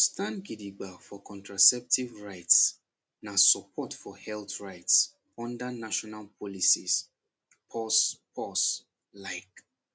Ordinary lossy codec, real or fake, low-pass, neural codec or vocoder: none; real; none; none